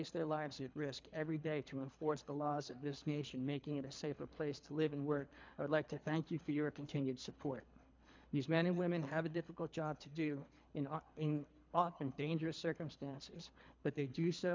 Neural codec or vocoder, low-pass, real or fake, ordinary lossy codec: codec, 24 kHz, 3 kbps, HILCodec; 7.2 kHz; fake; MP3, 64 kbps